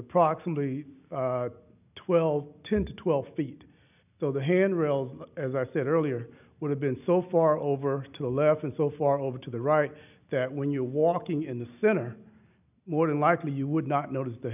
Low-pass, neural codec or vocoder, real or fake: 3.6 kHz; none; real